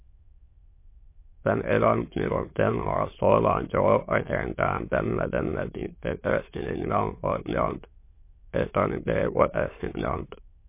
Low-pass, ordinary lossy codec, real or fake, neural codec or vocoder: 3.6 kHz; MP3, 24 kbps; fake; autoencoder, 22.05 kHz, a latent of 192 numbers a frame, VITS, trained on many speakers